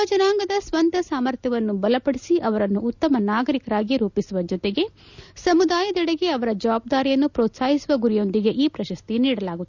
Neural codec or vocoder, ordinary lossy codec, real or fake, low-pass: none; none; real; 7.2 kHz